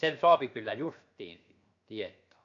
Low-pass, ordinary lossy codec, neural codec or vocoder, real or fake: 7.2 kHz; AAC, 48 kbps; codec, 16 kHz, 0.7 kbps, FocalCodec; fake